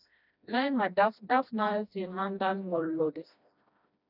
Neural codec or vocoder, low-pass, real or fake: codec, 16 kHz, 1 kbps, FreqCodec, smaller model; 5.4 kHz; fake